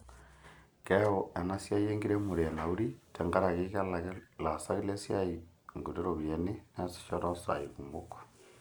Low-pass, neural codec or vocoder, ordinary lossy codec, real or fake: none; none; none; real